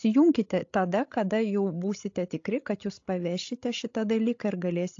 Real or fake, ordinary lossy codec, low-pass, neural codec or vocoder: fake; AAC, 48 kbps; 7.2 kHz; codec, 16 kHz, 16 kbps, FreqCodec, larger model